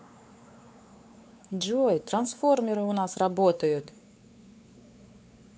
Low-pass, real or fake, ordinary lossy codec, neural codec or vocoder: none; fake; none; codec, 16 kHz, 4 kbps, X-Codec, WavLM features, trained on Multilingual LibriSpeech